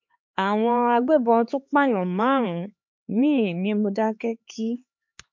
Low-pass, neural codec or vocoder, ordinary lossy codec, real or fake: 7.2 kHz; codec, 16 kHz, 4 kbps, X-Codec, HuBERT features, trained on LibriSpeech; MP3, 48 kbps; fake